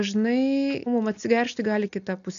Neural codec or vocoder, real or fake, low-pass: none; real; 7.2 kHz